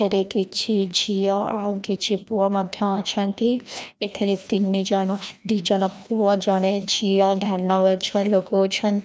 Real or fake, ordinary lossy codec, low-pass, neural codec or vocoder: fake; none; none; codec, 16 kHz, 1 kbps, FreqCodec, larger model